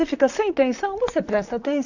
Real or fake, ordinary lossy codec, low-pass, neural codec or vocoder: fake; none; 7.2 kHz; vocoder, 44.1 kHz, 128 mel bands, Pupu-Vocoder